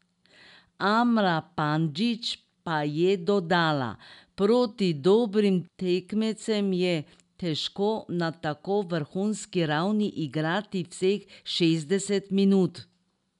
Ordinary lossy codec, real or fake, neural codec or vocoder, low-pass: none; real; none; 10.8 kHz